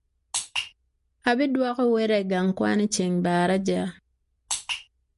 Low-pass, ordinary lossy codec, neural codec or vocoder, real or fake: 14.4 kHz; MP3, 48 kbps; none; real